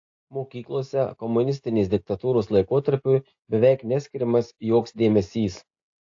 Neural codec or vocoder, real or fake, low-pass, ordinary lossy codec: none; real; 7.2 kHz; AAC, 48 kbps